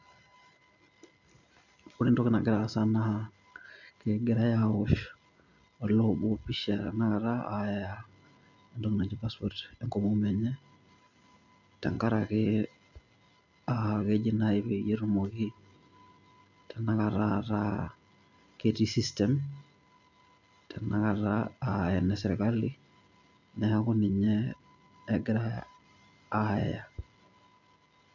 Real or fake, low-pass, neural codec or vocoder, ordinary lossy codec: real; 7.2 kHz; none; none